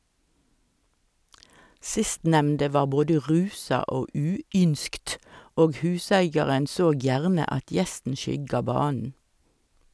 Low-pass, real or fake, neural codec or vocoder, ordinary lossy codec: none; real; none; none